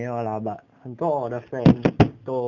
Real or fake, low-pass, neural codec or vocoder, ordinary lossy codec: real; 7.2 kHz; none; none